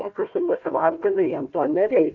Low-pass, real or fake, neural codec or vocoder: 7.2 kHz; fake; codec, 16 kHz, 1 kbps, FunCodec, trained on Chinese and English, 50 frames a second